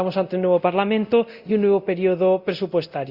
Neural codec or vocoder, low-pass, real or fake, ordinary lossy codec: codec, 24 kHz, 0.9 kbps, DualCodec; 5.4 kHz; fake; Opus, 64 kbps